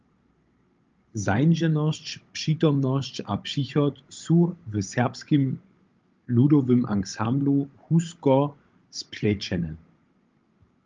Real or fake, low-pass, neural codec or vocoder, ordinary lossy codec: fake; 7.2 kHz; codec, 16 kHz, 16 kbps, FunCodec, trained on Chinese and English, 50 frames a second; Opus, 24 kbps